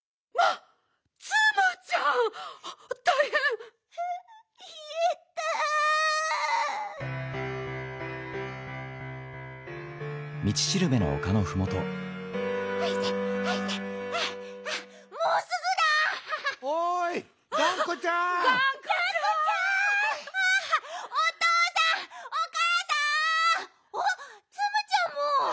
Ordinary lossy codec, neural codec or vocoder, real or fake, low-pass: none; none; real; none